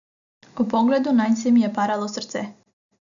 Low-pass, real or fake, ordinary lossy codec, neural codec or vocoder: 7.2 kHz; real; none; none